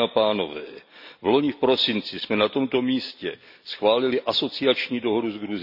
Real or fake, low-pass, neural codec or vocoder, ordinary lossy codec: real; 5.4 kHz; none; none